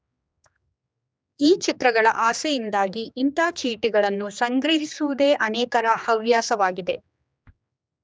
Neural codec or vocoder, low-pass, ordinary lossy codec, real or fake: codec, 16 kHz, 2 kbps, X-Codec, HuBERT features, trained on general audio; none; none; fake